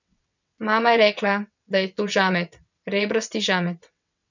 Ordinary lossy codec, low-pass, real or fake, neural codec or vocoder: none; 7.2 kHz; fake; vocoder, 22.05 kHz, 80 mel bands, WaveNeXt